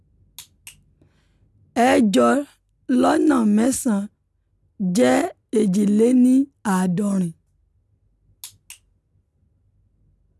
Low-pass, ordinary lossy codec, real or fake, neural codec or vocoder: none; none; real; none